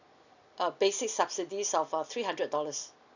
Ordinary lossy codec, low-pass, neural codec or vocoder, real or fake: none; 7.2 kHz; none; real